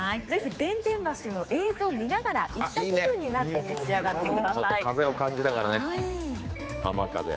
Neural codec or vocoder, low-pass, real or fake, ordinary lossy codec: codec, 16 kHz, 4 kbps, X-Codec, HuBERT features, trained on general audio; none; fake; none